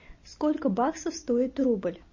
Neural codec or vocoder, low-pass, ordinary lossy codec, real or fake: none; 7.2 kHz; MP3, 32 kbps; real